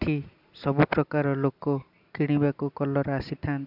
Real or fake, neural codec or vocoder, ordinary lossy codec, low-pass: real; none; none; 5.4 kHz